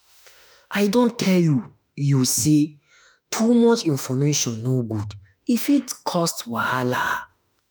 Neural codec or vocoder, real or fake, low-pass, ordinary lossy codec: autoencoder, 48 kHz, 32 numbers a frame, DAC-VAE, trained on Japanese speech; fake; none; none